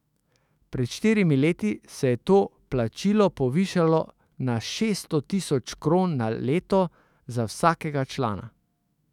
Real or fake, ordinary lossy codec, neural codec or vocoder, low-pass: fake; none; autoencoder, 48 kHz, 128 numbers a frame, DAC-VAE, trained on Japanese speech; 19.8 kHz